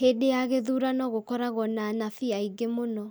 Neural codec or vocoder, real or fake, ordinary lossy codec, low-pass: none; real; none; none